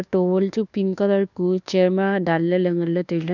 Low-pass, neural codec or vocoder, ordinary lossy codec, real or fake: 7.2 kHz; codec, 24 kHz, 1.2 kbps, DualCodec; none; fake